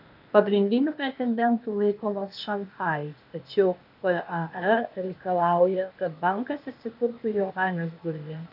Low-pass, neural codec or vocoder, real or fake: 5.4 kHz; codec, 16 kHz, 0.8 kbps, ZipCodec; fake